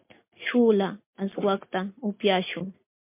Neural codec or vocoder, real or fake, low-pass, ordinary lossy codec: none; real; 3.6 kHz; MP3, 32 kbps